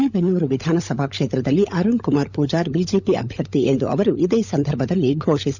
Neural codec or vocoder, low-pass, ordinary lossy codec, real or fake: codec, 16 kHz, 16 kbps, FunCodec, trained on LibriTTS, 50 frames a second; 7.2 kHz; none; fake